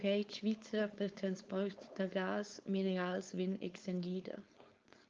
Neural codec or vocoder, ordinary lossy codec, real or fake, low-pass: codec, 16 kHz, 4.8 kbps, FACodec; Opus, 24 kbps; fake; 7.2 kHz